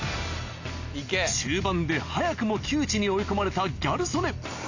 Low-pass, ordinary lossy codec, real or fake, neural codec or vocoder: 7.2 kHz; AAC, 48 kbps; real; none